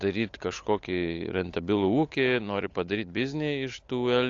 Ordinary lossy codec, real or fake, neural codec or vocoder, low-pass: AAC, 48 kbps; fake; codec, 16 kHz, 8 kbps, FunCodec, trained on Chinese and English, 25 frames a second; 7.2 kHz